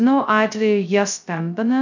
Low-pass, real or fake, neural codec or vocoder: 7.2 kHz; fake; codec, 16 kHz, 0.2 kbps, FocalCodec